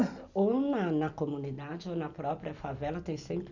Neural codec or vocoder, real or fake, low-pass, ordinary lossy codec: none; real; 7.2 kHz; none